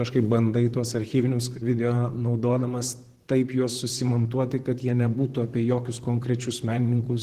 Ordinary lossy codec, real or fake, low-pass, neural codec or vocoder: Opus, 16 kbps; fake; 14.4 kHz; vocoder, 44.1 kHz, 128 mel bands, Pupu-Vocoder